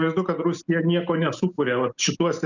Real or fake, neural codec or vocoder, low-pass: real; none; 7.2 kHz